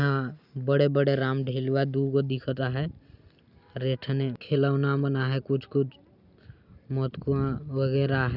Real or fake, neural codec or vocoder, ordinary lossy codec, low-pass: real; none; none; 5.4 kHz